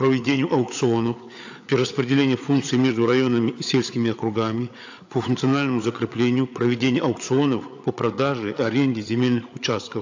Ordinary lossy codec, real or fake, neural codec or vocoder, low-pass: AAC, 48 kbps; fake; codec, 16 kHz, 8 kbps, FreqCodec, larger model; 7.2 kHz